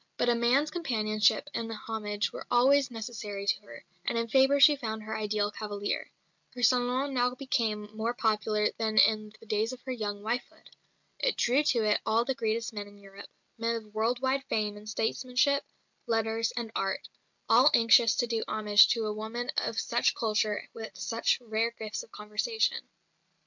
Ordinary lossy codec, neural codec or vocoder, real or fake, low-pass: MP3, 64 kbps; none; real; 7.2 kHz